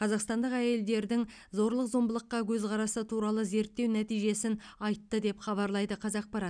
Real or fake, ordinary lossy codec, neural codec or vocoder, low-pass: real; none; none; 9.9 kHz